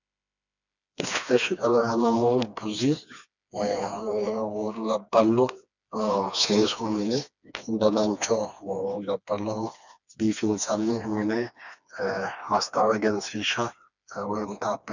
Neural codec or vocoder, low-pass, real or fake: codec, 16 kHz, 2 kbps, FreqCodec, smaller model; 7.2 kHz; fake